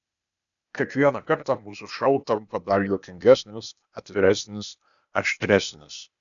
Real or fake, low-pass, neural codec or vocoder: fake; 7.2 kHz; codec, 16 kHz, 0.8 kbps, ZipCodec